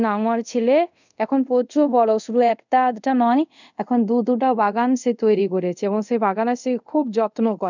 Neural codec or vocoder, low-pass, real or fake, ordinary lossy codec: codec, 24 kHz, 0.5 kbps, DualCodec; 7.2 kHz; fake; none